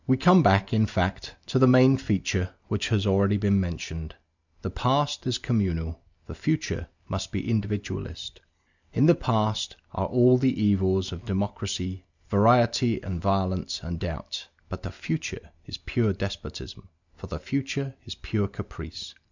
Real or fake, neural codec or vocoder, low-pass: real; none; 7.2 kHz